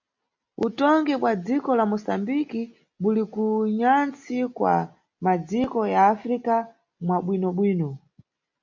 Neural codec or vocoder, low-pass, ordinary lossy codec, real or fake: none; 7.2 kHz; MP3, 48 kbps; real